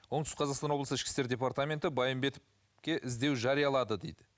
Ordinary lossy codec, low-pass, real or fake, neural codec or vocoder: none; none; real; none